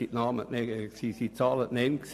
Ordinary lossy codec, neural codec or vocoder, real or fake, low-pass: AAC, 96 kbps; vocoder, 44.1 kHz, 128 mel bands every 256 samples, BigVGAN v2; fake; 14.4 kHz